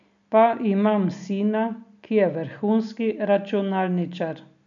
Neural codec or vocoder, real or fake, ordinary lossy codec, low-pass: none; real; none; 7.2 kHz